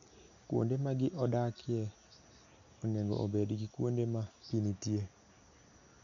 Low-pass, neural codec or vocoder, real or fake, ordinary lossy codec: 7.2 kHz; none; real; none